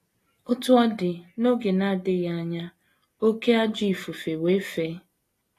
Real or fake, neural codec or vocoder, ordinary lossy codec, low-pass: real; none; AAC, 48 kbps; 14.4 kHz